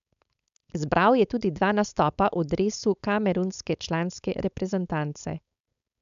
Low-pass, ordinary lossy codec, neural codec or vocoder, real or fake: 7.2 kHz; MP3, 96 kbps; codec, 16 kHz, 4.8 kbps, FACodec; fake